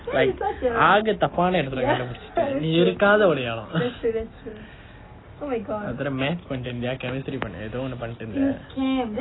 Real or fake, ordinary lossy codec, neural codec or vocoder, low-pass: real; AAC, 16 kbps; none; 7.2 kHz